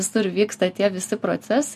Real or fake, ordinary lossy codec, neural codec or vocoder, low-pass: real; MP3, 64 kbps; none; 14.4 kHz